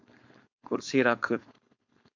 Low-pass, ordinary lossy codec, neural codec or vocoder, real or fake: 7.2 kHz; MP3, 64 kbps; codec, 16 kHz, 4.8 kbps, FACodec; fake